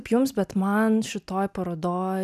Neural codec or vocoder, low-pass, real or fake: none; 14.4 kHz; real